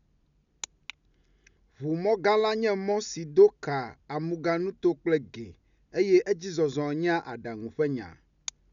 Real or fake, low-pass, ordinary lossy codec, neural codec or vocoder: real; 7.2 kHz; none; none